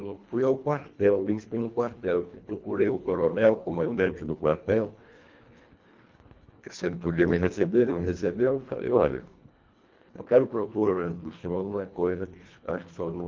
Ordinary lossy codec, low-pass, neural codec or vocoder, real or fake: Opus, 24 kbps; 7.2 kHz; codec, 24 kHz, 1.5 kbps, HILCodec; fake